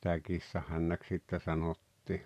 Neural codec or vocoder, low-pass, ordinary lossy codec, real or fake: vocoder, 44.1 kHz, 128 mel bands every 512 samples, BigVGAN v2; 14.4 kHz; none; fake